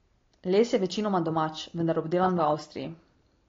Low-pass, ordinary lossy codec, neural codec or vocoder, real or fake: 7.2 kHz; AAC, 32 kbps; none; real